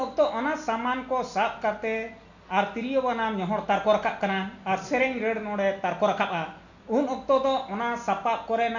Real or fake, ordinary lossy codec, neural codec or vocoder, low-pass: real; none; none; 7.2 kHz